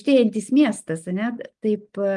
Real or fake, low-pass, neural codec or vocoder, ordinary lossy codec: real; 10.8 kHz; none; Opus, 32 kbps